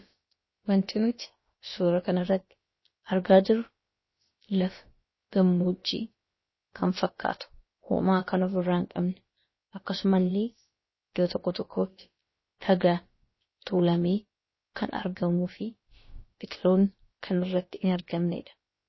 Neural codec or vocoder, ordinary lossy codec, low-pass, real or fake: codec, 16 kHz, about 1 kbps, DyCAST, with the encoder's durations; MP3, 24 kbps; 7.2 kHz; fake